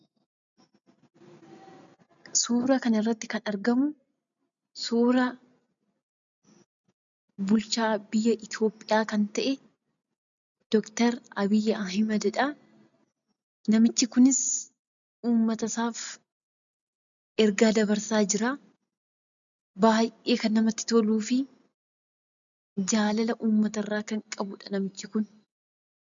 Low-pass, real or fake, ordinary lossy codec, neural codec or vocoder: 7.2 kHz; real; MP3, 96 kbps; none